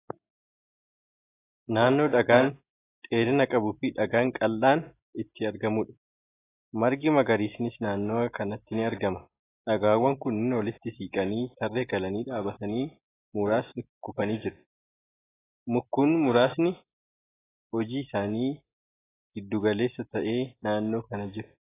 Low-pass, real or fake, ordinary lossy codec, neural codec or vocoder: 3.6 kHz; real; AAC, 16 kbps; none